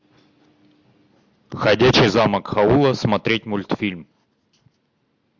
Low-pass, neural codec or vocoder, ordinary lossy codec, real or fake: 7.2 kHz; none; MP3, 64 kbps; real